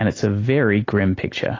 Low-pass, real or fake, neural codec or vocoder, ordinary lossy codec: 7.2 kHz; real; none; AAC, 32 kbps